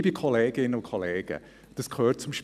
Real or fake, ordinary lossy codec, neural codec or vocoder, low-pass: real; none; none; 14.4 kHz